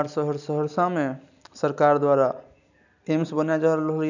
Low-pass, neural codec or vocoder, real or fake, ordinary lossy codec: 7.2 kHz; none; real; none